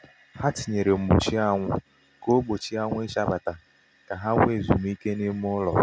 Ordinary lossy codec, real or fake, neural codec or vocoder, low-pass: none; real; none; none